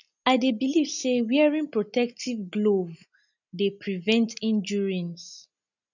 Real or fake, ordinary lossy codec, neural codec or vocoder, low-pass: real; none; none; 7.2 kHz